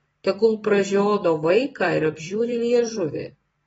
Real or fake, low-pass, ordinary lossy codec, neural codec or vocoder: fake; 19.8 kHz; AAC, 24 kbps; vocoder, 44.1 kHz, 128 mel bands every 256 samples, BigVGAN v2